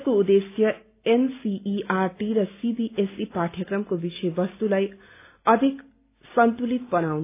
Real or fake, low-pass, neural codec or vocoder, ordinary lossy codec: real; 3.6 kHz; none; AAC, 24 kbps